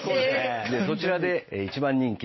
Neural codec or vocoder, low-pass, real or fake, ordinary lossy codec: none; 7.2 kHz; real; MP3, 24 kbps